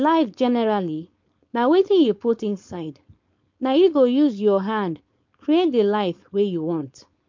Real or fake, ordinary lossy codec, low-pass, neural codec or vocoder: fake; MP3, 48 kbps; 7.2 kHz; codec, 16 kHz, 4.8 kbps, FACodec